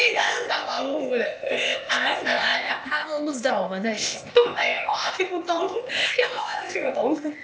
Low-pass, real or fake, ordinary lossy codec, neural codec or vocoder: none; fake; none; codec, 16 kHz, 0.8 kbps, ZipCodec